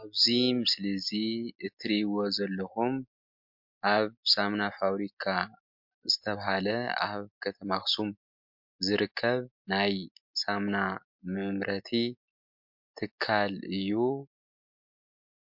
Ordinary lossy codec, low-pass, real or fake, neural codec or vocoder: MP3, 48 kbps; 5.4 kHz; real; none